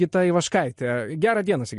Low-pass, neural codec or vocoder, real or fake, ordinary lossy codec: 14.4 kHz; none; real; MP3, 48 kbps